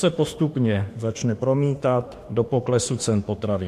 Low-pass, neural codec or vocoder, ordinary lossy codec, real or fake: 14.4 kHz; autoencoder, 48 kHz, 32 numbers a frame, DAC-VAE, trained on Japanese speech; AAC, 64 kbps; fake